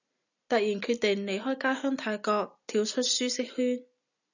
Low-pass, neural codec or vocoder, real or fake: 7.2 kHz; none; real